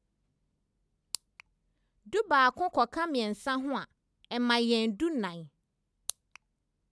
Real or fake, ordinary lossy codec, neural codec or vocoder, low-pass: real; none; none; none